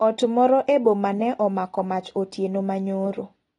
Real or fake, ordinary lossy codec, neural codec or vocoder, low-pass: real; AAC, 32 kbps; none; 10.8 kHz